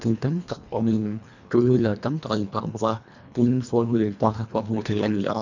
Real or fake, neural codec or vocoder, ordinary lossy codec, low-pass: fake; codec, 24 kHz, 1.5 kbps, HILCodec; none; 7.2 kHz